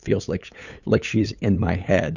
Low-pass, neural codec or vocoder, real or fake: 7.2 kHz; codec, 16 kHz, 8 kbps, FunCodec, trained on LibriTTS, 25 frames a second; fake